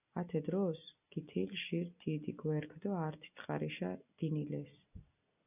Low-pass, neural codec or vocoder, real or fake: 3.6 kHz; none; real